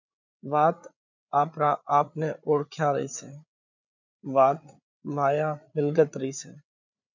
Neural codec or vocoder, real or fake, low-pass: codec, 16 kHz, 8 kbps, FreqCodec, larger model; fake; 7.2 kHz